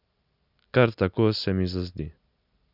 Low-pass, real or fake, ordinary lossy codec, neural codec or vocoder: 5.4 kHz; real; none; none